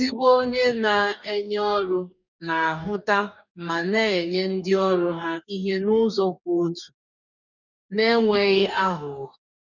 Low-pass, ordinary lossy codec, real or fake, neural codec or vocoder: 7.2 kHz; none; fake; codec, 44.1 kHz, 2.6 kbps, DAC